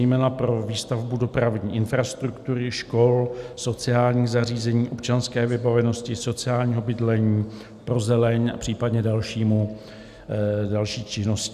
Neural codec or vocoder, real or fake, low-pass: none; real; 14.4 kHz